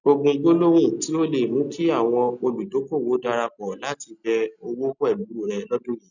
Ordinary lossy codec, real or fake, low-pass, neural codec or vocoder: none; real; 7.2 kHz; none